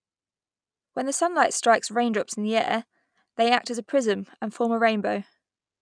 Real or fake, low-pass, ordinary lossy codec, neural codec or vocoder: real; 9.9 kHz; none; none